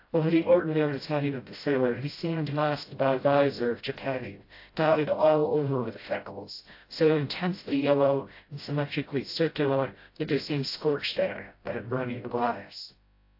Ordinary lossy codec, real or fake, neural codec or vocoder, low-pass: AAC, 32 kbps; fake; codec, 16 kHz, 0.5 kbps, FreqCodec, smaller model; 5.4 kHz